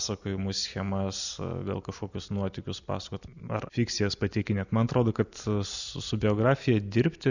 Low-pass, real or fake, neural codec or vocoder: 7.2 kHz; real; none